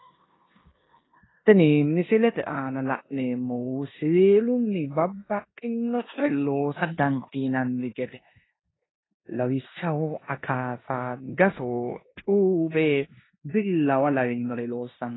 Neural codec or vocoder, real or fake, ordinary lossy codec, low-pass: codec, 16 kHz in and 24 kHz out, 0.9 kbps, LongCat-Audio-Codec, four codebook decoder; fake; AAC, 16 kbps; 7.2 kHz